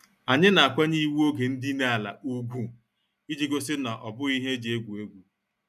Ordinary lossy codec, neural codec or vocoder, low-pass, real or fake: none; none; 14.4 kHz; real